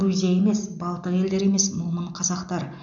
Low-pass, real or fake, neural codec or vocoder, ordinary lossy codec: 7.2 kHz; real; none; none